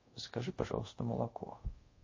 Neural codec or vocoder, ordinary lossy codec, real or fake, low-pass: codec, 24 kHz, 0.5 kbps, DualCodec; MP3, 32 kbps; fake; 7.2 kHz